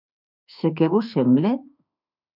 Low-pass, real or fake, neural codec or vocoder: 5.4 kHz; fake; autoencoder, 48 kHz, 32 numbers a frame, DAC-VAE, trained on Japanese speech